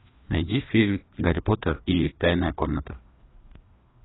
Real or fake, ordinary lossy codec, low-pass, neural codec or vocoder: fake; AAC, 16 kbps; 7.2 kHz; codec, 16 kHz, 2 kbps, FreqCodec, larger model